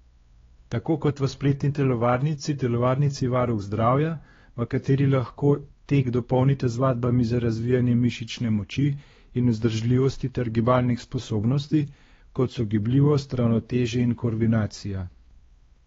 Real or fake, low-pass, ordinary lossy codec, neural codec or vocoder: fake; 7.2 kHz; AAC, 24 kbps; codec, 16 kHz, 2 kbps, X-Codec, WavLM features, trained on Multilingual LibriSpeech